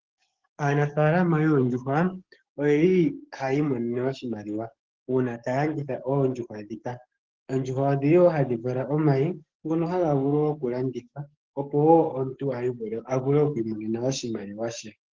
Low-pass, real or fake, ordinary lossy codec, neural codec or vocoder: 7.2 kHz; fake; Opus, 16 kbps; codec, 44.1 kHz, 7.8 kbps, DAC